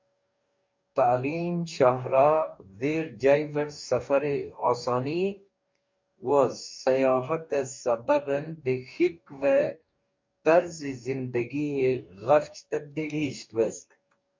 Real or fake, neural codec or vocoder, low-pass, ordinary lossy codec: fake; codec, 44.1 kHz, 2.6 kbps, DAC; 7.2 kHz; MP3, 64 kbps